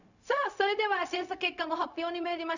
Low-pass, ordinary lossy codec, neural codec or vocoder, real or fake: 7.2 kHz; none; codec, 16 kHz, 0.4 kbps, LongCat-Audio-Codec; fake